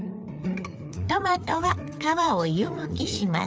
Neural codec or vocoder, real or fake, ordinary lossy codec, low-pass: codec, 16 kHz, 4 kbps, FreqCodec, larger model; fake; none; none